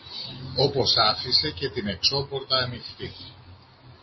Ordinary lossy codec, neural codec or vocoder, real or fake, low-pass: MP3, 24 kbps; none; real; 7.2 kHz